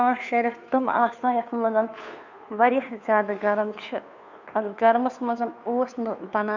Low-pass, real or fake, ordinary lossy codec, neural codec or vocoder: 7.2 kHz; fake; none; codec, 16 kHz, 2 kbps, FunCodec, trained on Chinese and English, 25 frames a second